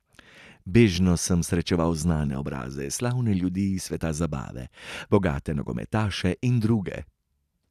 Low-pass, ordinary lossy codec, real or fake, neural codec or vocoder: 14.4 kHz; none; real; none